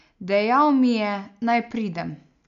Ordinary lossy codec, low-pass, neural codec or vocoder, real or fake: none; 7.2 kHz; none; real